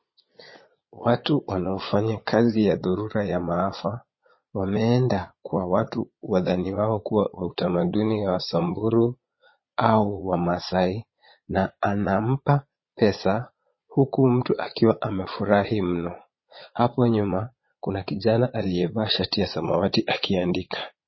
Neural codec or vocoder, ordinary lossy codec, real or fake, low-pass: vocoder, 22.05 kHz, 80 mel bands, Vocos; MP3, 24 kbps; fake; 7.2 kHz